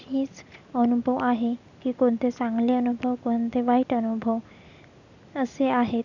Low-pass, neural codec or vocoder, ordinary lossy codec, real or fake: 7.2 kHz; none; none; real